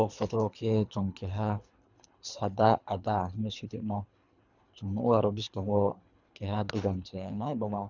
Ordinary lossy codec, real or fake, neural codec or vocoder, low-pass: none; fake; codec, 24 kHz, 3 kbps, HILCodec; 7.2 kHz